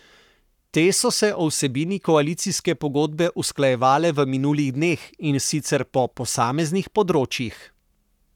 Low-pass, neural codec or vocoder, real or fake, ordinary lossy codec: 19.8 kHz; codec, 44.1 kHz, 7.8 kbps, Pupu-Codec; fake; none